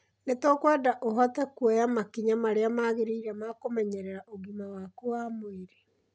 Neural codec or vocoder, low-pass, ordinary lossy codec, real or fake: none; none; none; real